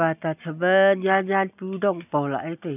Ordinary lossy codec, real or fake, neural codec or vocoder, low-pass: none; real; none; 3.6 kHz